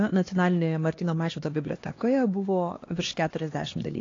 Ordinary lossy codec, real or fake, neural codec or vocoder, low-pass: AAC, 32 kbps; fake; codec, 16 kHz, 2 kbps, X-Codec, HuBERT features, trained on LibriSpeech; 7.2 kHz